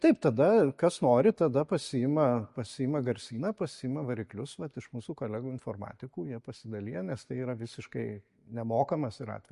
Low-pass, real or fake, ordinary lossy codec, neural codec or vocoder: 14.4 kHz; real; MP3, 48 kbps; none